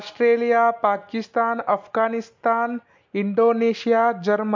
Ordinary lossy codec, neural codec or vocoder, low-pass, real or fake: MP3, 48 kbps; none; 7.2 kHz; real